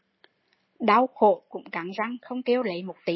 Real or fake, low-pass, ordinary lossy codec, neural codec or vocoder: real; 7.2 kHz; MP3, 24 kbps; none